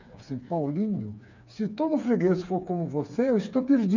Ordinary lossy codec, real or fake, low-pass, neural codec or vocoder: none; fake; 7.2 kHz; codec, 16 kHz, 4 kbps, FreqCodec, smaller model